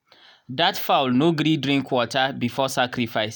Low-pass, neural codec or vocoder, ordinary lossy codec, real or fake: none; none; none; real